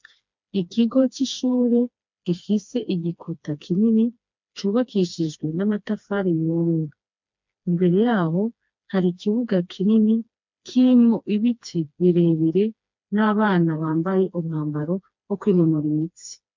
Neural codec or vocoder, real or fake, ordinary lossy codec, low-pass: codec, 16 kHz, 2 kbps, FreqCodec, smaller model; fake; MP3, 64 kbps; 7.2 kHz